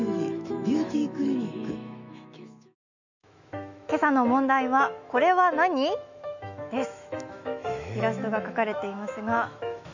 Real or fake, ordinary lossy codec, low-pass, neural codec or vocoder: fake; none; 7.2 kHz; autoencoder, 48 kHz, 128 numbers a frame, DAC-VAE, trained on Japanese speech